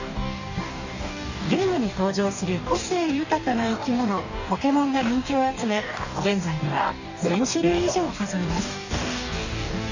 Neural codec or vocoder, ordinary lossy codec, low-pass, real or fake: codec, 44.1 kHz, 2.6 kbps, DAC; none; 7.2 kHz; fake